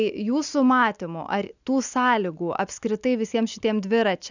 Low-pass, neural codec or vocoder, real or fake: 7.2 kHz; none; real